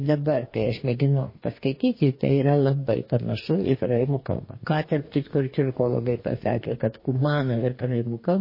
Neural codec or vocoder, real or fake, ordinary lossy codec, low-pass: codec, 44.1 kHz, 2.6 kbps, DAC; fake; MP3, 24 kbps; 5.4 kHz